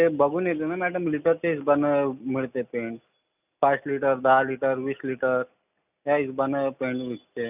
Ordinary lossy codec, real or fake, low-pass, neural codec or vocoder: none; real; 3.6 kHz; none